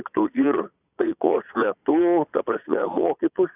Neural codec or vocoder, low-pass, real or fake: vocoder, 22.05 kHz, 80 mel bands, Vocos; 3.6 kHz; fake